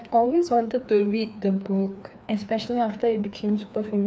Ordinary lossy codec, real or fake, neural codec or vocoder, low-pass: none; fake; codec, 16 kHz, 2 kbps, FreqCodec, larger model; none